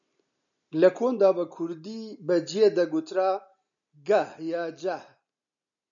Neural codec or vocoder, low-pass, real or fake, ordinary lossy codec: none; 7.2 kHz; real; MP3, 96 kbps